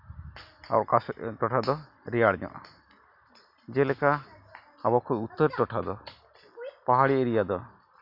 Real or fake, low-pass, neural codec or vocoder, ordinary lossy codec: real; 5.4 kHz; none; none